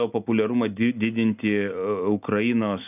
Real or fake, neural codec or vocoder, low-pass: real; none; 3.6 kHz